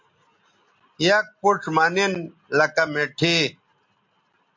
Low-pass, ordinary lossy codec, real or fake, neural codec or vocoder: 7.2 kHz; MP3, 48 kbps; real; none